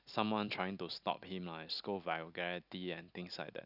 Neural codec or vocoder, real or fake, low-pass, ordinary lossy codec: none; real; 5.4 kHz; none